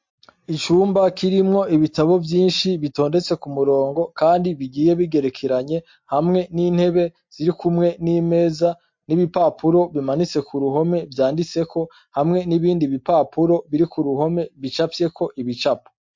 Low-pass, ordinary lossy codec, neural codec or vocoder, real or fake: 7.2 kHz; MP3, 48 kbps; none; real